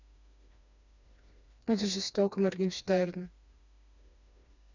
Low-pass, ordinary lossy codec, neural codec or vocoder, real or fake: 7.2 kHz; none; codec, 16 kHz, 2 kbps, FreqCodec, smaller model; fake